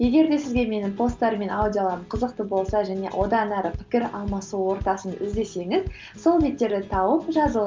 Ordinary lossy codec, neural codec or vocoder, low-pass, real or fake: Opus, 24 kbps; none; 7.2 kHz; real